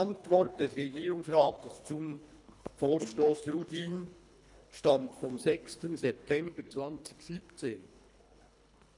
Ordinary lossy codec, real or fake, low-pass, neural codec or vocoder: none; fake; 10.8 kHz; codec, 24 kHz, 1.5 kbps, HILCodec